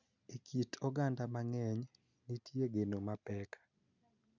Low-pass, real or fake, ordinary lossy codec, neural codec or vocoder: 7.2 kHz; real; none; none